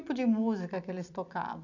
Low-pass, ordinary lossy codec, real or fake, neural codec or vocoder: 7.2 kHz; none; fake; vocoder, 44.1 kHz, 128 mel bands every 256 samples, BigVGAN v2